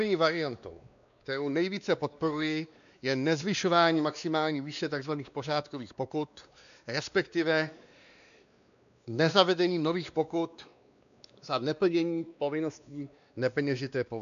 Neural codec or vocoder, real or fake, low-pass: codec, 16 kHz, 2 kbps, X-Codec, WavLM features, trained on Multilingual LibriSpeech; fake; 7.2 kHz